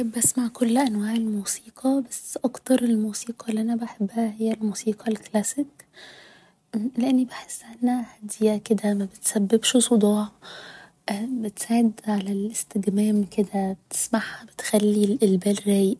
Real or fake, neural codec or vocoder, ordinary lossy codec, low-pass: real; none; none; none